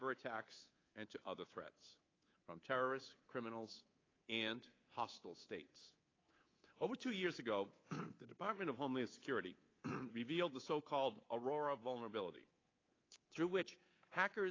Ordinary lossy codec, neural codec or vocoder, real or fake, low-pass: AAC, 32 kbps; codec, 24 kHz, 3.1 kbps, DualCodec; fake; 7.2 kHz